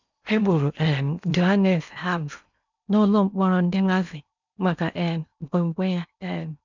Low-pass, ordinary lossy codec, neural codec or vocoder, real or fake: 7.2 kHz; none; codec, 16 kHz in and 24 kHz out, 0.8 kbps, FocalCodec, streaming, 65536 codes; fake